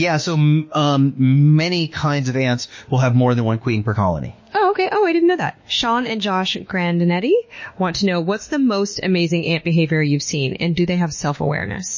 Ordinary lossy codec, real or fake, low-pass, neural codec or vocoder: MP3, 32 kbps; fake; 7.2 kHz; autoencoder, 48 kHz, 32 numbers a frame, DAC-VAE, trained on Japanese speech